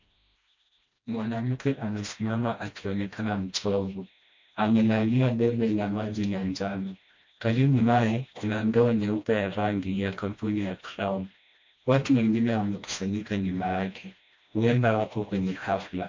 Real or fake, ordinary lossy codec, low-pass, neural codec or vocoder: fake; MP3, 48 kbps; 7.2 kHz; codec, 16 kHz, 1 kbps, FreqCodec, smaller model